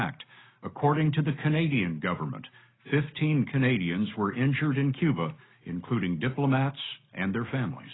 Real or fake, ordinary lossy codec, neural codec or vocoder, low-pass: real; AAC, 16 kbps; none; 7.2 kHz